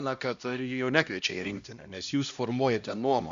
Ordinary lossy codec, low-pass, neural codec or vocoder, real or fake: Opus, 64 kbps; 7.2 kHz; codec, 16 kHz, 1 kbps, X-Codec, HuBERT features, trained on LibriSpeech; fake